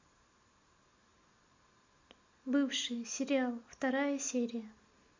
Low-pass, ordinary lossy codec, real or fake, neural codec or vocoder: 7.2 kHz; MP3, 48 kbps; real; none